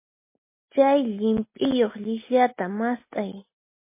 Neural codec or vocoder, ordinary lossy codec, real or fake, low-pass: none; MP3, 24 kbps; real; 3.6 kHz